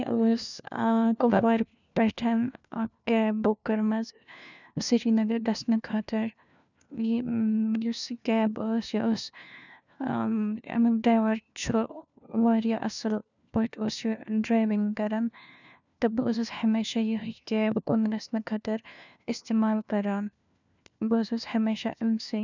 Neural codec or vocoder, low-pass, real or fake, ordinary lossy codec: codec, 16 kHz, 1 kbps, FunCodec, trained on LibriTTS, 50 frames a second; 7.2 kHz; fake; none